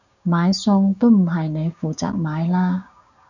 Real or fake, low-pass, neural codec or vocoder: fake; 7.2 kHz; codec, 16 kHz, 6 kbps, DAC